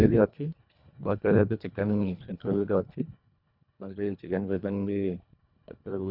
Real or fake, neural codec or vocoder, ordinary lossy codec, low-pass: fake; codec, 24 kHz, 1.5 kbps, HILCodec; none; 5.4 kHz